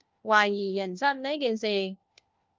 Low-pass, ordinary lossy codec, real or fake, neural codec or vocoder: 7.2 kHz; Opus, 24 kbps; fake; codec, 16 kHz, 1 kbps, FunCodec, trained on LibriTTS, 50 frames a second